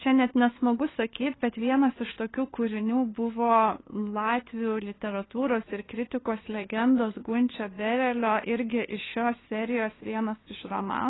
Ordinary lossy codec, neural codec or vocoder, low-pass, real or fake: AAC, 16 kbps; codec, 16 kHz, 2 kbps, FunCodec, trained on LibriTTS, 25 frames a second; 7.2 kHz; fake